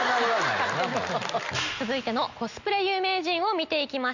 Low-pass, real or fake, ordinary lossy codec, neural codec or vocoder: 7.2 kHz; real; none; none